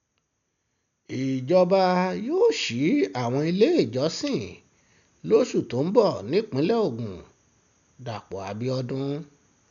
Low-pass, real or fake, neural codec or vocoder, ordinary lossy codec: 7.2 kHz; real; none; none